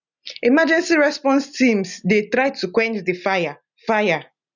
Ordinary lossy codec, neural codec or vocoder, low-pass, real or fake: none; none; 7.2 kHz; real